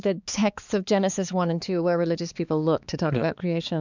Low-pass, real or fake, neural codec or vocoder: 7.2 kHz; fake; codec, 16 kHz, 4 kbps, X-Codec, HuBERT features, trained on balanced general audio